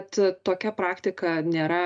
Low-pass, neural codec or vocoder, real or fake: 9.9 kHz; none; real